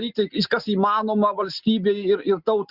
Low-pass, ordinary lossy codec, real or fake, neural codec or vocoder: 5.4 kHz; Opus, 64 kbps; real; none